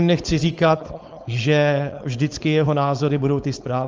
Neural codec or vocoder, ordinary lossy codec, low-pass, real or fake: codec, 16 kHz, 4.8 kbps, FACodec; Opus, 24 kbps; 7.2 kHz; fake